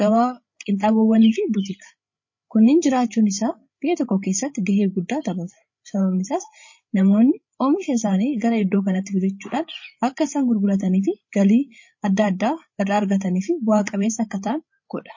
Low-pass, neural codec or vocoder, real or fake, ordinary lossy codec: 7.2 kHz; codec, 16 kHz, 8 kbps, FreqCodec, larger model; fake; MP3, 32 kbps